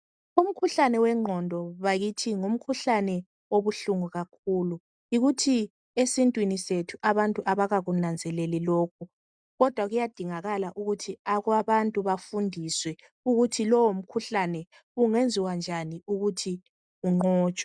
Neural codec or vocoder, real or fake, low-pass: none; real; 9.9 kHz